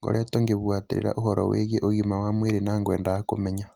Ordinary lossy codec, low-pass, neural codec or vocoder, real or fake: Opus, 32 kbps; 19.8 kHz; none; real